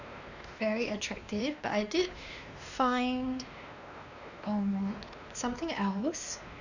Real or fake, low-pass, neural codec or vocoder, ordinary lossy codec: fake; 7.2 kHz; codec, 16 kHz, 2 kbps, X-Codec, WavLM features, trained on Multilingual LibriSpeech; none